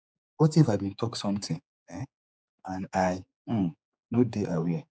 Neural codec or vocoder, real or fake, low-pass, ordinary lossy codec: codec, 16 kHz, 4 kbps, X-Codec, HuBERT features, trained on general audio; fake; none; none